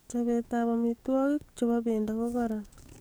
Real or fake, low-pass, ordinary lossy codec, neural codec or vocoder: fake; none; none; codec, 44.1 kHz, 7.8 kbps, DAC